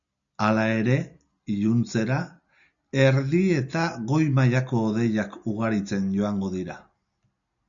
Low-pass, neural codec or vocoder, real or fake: 7.2 kHz; none; real